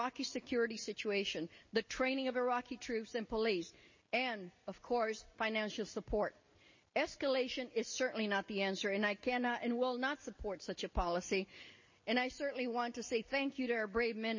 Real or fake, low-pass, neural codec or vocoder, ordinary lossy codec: real; 7.2 kHz; none; MP3, 32 kbps